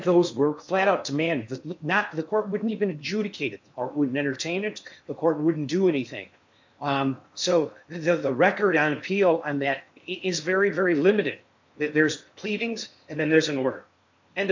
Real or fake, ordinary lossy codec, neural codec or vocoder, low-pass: fake; MP3, 48 kbps; codec, 16 kHz in and 24 kHz out, 0.8 kbps, FocalCodec, streaming, 65536 codes; 7.2 kHz